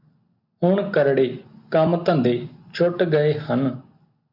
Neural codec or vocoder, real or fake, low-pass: none; real; 5.4 kHz